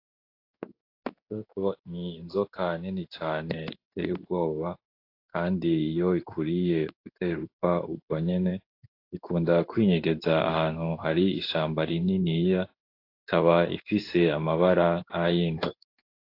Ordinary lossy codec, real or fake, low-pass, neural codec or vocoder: AAC, 32 kbps; fake; 5.4 kHz; codec, 16 kHz in and 24 kHz out, 1 kbps, XY-Tokenizer